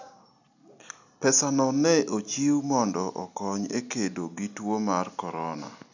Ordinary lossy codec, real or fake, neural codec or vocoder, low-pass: none; real; none; 7.2 kHz